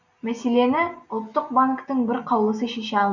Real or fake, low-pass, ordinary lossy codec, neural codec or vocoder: real; 7.2 kHz; none; none